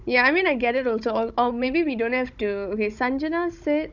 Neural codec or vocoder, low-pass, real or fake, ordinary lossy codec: codec, 16 kHz, 16 kbps, FunCodec, trained on Chinese and English, 50 frames a second; 7.2 kHz; fake; none